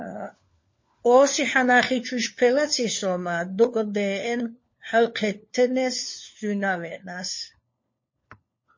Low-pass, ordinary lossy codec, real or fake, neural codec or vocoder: 7.2 kHz; MP3, 32 kbps; fake; codec, 16 kHz, 4 kbps, FunCodec, trained on LibriTTS, 50 frames a second